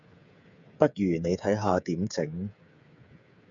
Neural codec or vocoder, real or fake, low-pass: codec, 16 kHz, 8 kbps, FreqCodec, smaller model; fake; 7.2 kHz